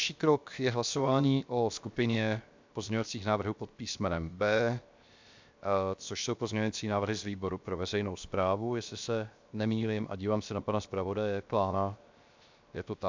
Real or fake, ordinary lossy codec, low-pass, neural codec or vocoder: fake; MP3, 64 kbps; 7.2 kHz; codec, 16 kHz, 0.7 kbps, FocalCodec